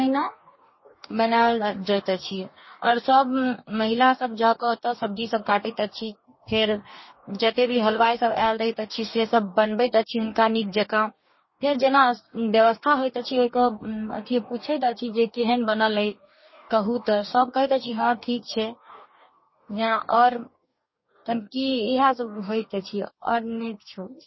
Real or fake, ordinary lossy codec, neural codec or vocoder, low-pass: fake; MP3, 24 kbps; codec, 44.1 kHz, 2.6 kbps, DAC; 7.2 kHz